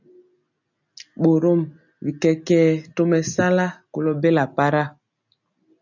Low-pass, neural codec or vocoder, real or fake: 7.2 kHz; none; real